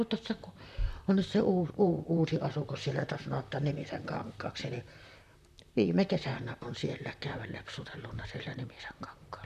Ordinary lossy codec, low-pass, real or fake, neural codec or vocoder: none; 14.4 kHz; fake; vocoder, 44.1 kHz, 128 mel bands, Pupu-Vocoder